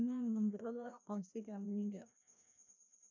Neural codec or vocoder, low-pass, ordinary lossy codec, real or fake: codec, 16 kHz, 1 kbps, FreqCodec, larger model; 7.2 kHz; none; fake